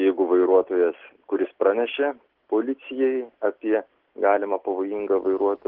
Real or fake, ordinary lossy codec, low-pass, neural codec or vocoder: real; Opus, 16 kbps; 5.4 kHz; none